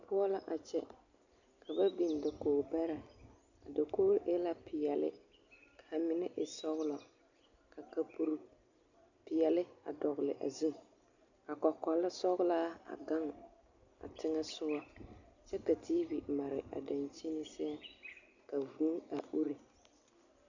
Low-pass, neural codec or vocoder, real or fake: 7.2 kHz; none; real